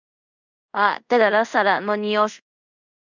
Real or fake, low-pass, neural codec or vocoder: fake; 7.2 kHz; codec, 24 kHz, 0.5 kbps, DualCodec